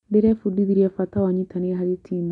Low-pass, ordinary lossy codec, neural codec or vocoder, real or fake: 10.8 kHz; none; none; real